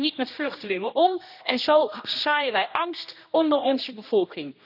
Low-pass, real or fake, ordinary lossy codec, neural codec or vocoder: 5.4 kHz; fake; none; codec, 16 kHz, 1 kbps, X-Codec, HuBERT features, trained on general audio